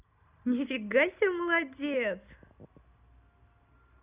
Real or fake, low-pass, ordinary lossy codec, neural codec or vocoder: real; 3.6 kHz; Opus, 64 kbps; none